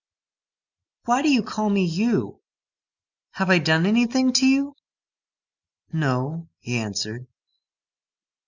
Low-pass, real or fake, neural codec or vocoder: 7.2 kHz; real; none